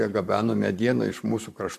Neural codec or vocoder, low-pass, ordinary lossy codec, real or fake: vocoder, 44.1 kHz, 128 mel bands, Pupu-Vocoder; 14.4 kHz; MP3, 96 kbps; fake